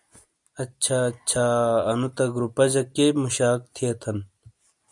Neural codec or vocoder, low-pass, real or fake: none; 10.8 kHz; real